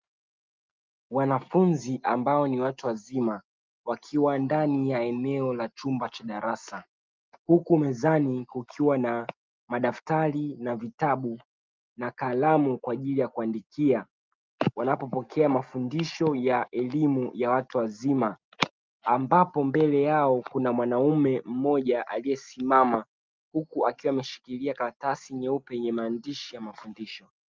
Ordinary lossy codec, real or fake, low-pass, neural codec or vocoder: Opus, 24 kbps; real; 7.2 kHz; none